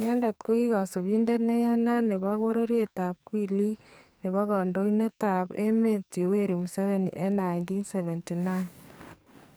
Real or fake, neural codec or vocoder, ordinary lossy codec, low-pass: fake; codec, 44.1 kHz, 2.6 kbps, SNAC; none; none